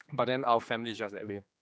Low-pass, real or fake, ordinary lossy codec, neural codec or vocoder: none; fake; none; codec, 16 kHz, 2 kbps, X-Codec, HuBERT features, trained on general audio